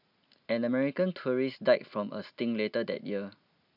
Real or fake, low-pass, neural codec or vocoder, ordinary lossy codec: real; 5.4 kHz; none; none